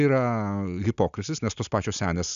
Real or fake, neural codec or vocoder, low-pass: real; none; 7.2 kHz